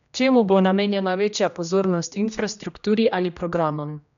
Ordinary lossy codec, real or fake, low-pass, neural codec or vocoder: none; fake; 7.2 kHz; codec, 16 kHz, 1 kbps, X-Codec, HuBERT features, trained on general audio